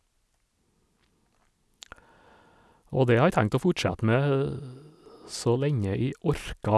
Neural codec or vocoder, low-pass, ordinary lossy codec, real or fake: none; none; none; real